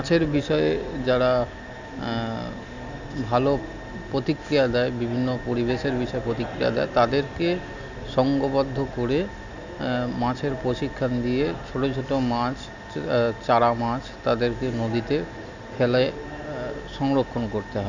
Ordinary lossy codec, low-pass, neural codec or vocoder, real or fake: none; 7.2 kHz; none; real